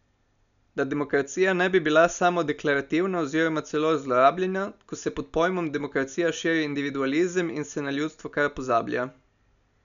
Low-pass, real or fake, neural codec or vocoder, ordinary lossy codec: 7.2 kHz; real; none; none